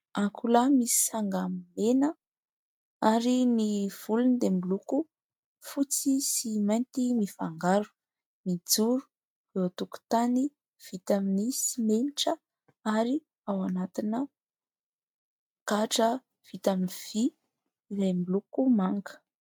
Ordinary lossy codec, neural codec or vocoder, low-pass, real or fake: MP3, 96 kbps; none; 19.8 kHz; real